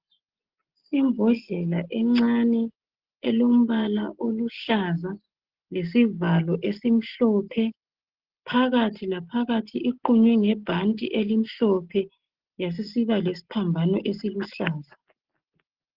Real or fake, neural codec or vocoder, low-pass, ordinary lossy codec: real; none; 5.4 kHz; Opus, 16 kbps